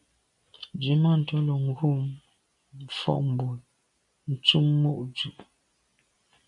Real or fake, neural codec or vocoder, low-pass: real; none; 10.8 kHz